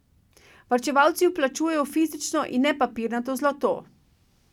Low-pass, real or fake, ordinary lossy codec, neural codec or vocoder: 19.8 kHz; real; none; none